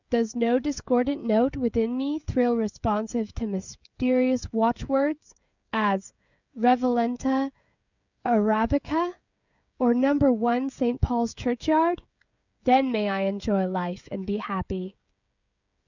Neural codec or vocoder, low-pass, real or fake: codec, 16 kHz, 16 kbps, FreqCodec, smaller model; 7.2 kHz; fake